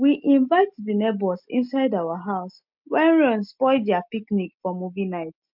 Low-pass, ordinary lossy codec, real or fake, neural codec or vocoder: 5.4 kHz; MP3, 48 kbps; real; none